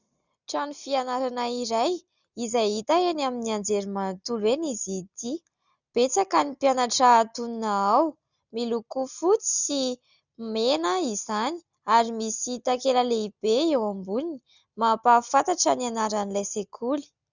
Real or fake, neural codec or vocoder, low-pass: real; none; 7.2 kHz